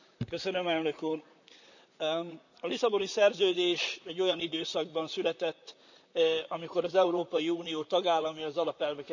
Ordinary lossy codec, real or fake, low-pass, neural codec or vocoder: none; fake; 7.2 kHz; vocoder, 44.1 kHz, 128 mel bands, Pupu-Vocoder